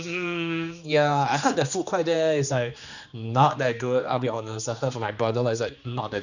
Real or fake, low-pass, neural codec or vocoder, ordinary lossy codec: fake; 7.2 kHz; codec, 16 kHz, 2 kbps, X-Codec, HuBERT features, trained on general audio; none